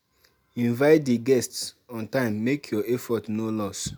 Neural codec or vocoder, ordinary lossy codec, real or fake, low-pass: none; none; real; none